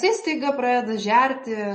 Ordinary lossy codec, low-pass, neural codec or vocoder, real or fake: MP3, 32 kbps; 9.9 kHz; none; real